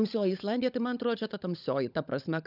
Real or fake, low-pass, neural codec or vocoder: fake; 5.4 kHz; codec, 16 kHz, 16 kbps, FunCodec, trained on LibriTTS, 50 frames a second